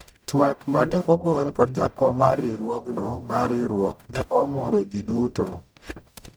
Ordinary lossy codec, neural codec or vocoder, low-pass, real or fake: none; codec, 44.1 kHz, 0.9 kbps, DAC; none; fake